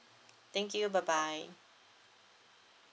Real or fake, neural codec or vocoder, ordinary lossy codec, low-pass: real; none; none; none